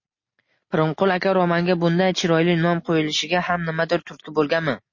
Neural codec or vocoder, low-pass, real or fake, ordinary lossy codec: none; 7.2 kHz; real; MP3, 32 kbps